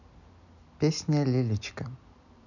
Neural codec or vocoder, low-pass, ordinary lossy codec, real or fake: none; 7.2 kHz; none; real